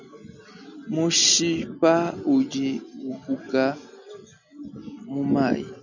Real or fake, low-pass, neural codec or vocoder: real; 7.2 kHz; none